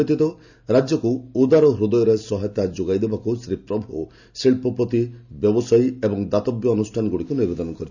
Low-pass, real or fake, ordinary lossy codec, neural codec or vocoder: 7.2 kHz; real; none; none